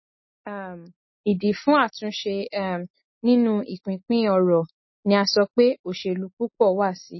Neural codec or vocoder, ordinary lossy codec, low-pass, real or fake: none; MP3, 24 kbps; 7.2 kHz; real